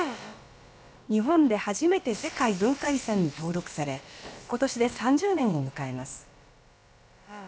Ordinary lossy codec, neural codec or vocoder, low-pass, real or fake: none; codec, 16 kHz, about 1 kbps, DyCAST, with the encoder's durations; none; fake